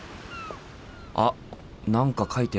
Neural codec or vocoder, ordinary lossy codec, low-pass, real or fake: none; none; none; real